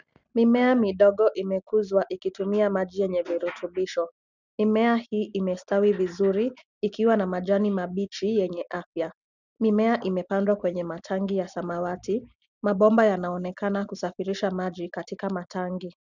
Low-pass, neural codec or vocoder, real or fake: 7.2 kHz; none; real